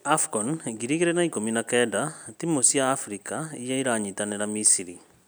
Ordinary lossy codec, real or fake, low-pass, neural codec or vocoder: none; real; none; none